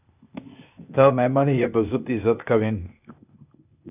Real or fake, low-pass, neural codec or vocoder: fake; 3.6 kHz; codec, 16 kHz, 0.8 kbps, ZipCodec